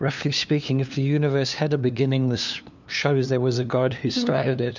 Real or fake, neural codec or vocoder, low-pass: fake; codec, 16 kHz, 2 kbps, FunCodec, trained on LibriTTS, 25 frames a second; 7.2 kHz